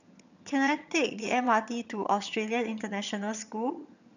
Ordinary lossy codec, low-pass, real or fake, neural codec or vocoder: none; 7.2 kHz; fake; vocoder, 22.05 kHz, 80 mel bands, HiFi-GAN